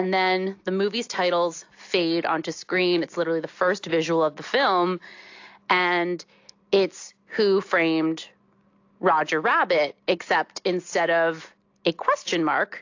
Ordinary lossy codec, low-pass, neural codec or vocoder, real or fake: AAC, 48 kbps; 7.2 kHz; none; real